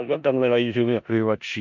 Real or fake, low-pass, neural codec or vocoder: fake; 7.2 kHz; codec, 16 kHz in and 24 kHz out, 0.4 kbps, LongCat-Audio-Codec, four codebook decoder